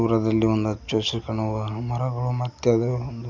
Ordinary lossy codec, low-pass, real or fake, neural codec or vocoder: none; 7.2 kHz; real; none